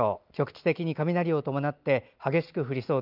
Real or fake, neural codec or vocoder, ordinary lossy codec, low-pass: real; none; Opus, 24 kbps; 5.4 kHz